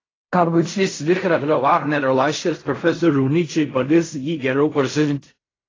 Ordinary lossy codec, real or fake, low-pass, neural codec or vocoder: AAC, 32 kbps; fake; 7.2 kHz; codec, 16 kHz in and 24 kHz out, 0.4 kbps, LongCat-Audio-Codec, fine tuned four codebook decoder